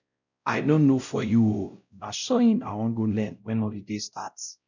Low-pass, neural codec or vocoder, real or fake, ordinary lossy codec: 7.2 kHz; codec, 16 kHz, 0.5 kbps, X-Codec, WavLM features, trained on Multilingual LibriSpeech; fake; none